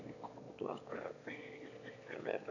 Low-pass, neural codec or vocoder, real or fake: 7.2 kHz; autoencoder, 22.05 kHz, a latent of 192 numbers a frame, VITS, trained on one speaker; fake